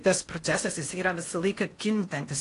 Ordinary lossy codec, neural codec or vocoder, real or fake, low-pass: AAC, 48 kbps; codec, 16 kHz in and 24 kHz out, 0.6 kbps, FocalCodec, streaming, 4096 codes; fake; 10.8 kHz